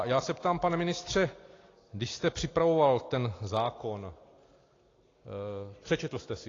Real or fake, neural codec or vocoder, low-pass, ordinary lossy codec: real; none; 7.2 kHz; AAC, 32 kbps